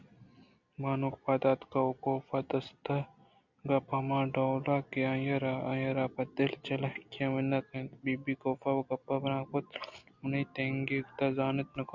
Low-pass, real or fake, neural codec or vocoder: 7.2 kHz; real; none